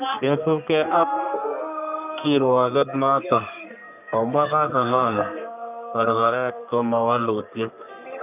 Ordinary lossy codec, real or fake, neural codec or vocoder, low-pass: none; fake; codec, 32 kHz, 1.9 kbps, SNAC; 3.6 kHz